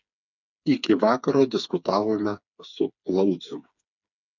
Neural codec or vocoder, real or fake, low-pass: codec, 16 kHz, 4 kbps, FreqCodec, smaller model; fake; 7.2 kHz